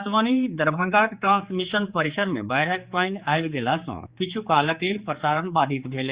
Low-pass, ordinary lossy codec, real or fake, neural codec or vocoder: 3.6 kHz; Opus, 64 kbps; fake; codec, 16 kHz, 4 kbps, X-Codec, HuBERT features, trained on general audio